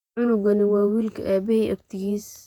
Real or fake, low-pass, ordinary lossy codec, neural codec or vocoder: fake; 19.8 kHz; none; vocoder, 48 kHz, 128 mel bands, Vocos